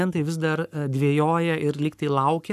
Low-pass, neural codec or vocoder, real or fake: 14.4 kHz; codec, 44.1 kHz, 7.8 kbps, Pupu-Codec; fake